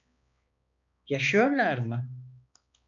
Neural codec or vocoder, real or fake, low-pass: codec, 16 kHz, 2 kbps, X-Codec, HuBERT features, trained on balanced general audio; fake; 7.2 kHz